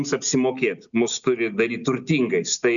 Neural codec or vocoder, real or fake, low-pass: none; real; 7.2 kHz